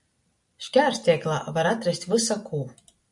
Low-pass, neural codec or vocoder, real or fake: 10.8 kHz; none; real